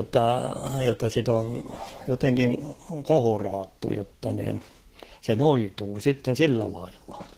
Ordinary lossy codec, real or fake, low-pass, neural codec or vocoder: Opus, 16 kbps; fake; 14.4 kHz; codec, 32 kHz, 1.9 kbps, SNAC